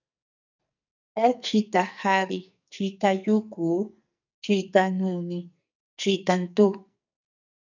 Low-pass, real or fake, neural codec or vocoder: 7.2 kHz; fake; codec, 44.1 kHz, 2.6 kbps, SNAC